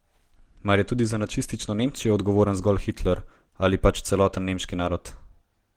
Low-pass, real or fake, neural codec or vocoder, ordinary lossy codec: 19.8 kHz; real; none; Opus, 16 kbps